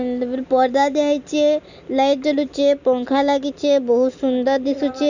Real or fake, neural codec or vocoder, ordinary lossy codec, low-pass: real; none; none; 7.2 kHz